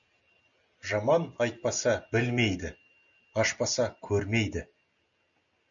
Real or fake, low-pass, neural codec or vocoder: real; 7.2 kHz; none